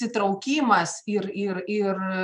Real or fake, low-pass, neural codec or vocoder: fake; 10.8 kHz; vocoder, 24 kHz, 100 mel bands, Vocos